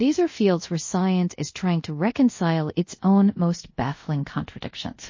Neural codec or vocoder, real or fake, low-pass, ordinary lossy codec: codec, 24 kHz, 0.5 kbps, DualCodec; fake; 7.2 kHz; MP3, 32 kbps